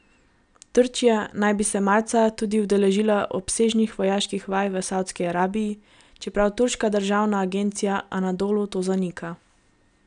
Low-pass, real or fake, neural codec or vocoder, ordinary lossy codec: 9.9 kHz; real; none; none